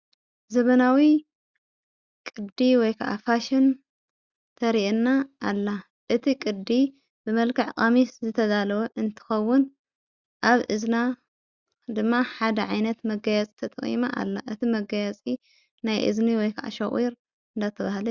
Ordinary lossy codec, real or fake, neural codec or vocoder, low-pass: Opus, 64 kbps; real; none; 7.2 kHz